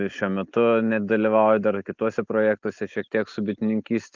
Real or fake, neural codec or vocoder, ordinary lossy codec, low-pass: real; none; Opus, 24 kbps; 7.2 kHz